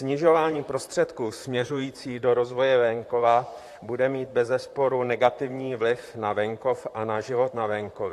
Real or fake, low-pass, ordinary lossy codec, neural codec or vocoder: fake; 14.4 kHz; AAC, 64 kbps; vocoder, 44.1 kHz, 128 mel bands, Pupu-Vocoder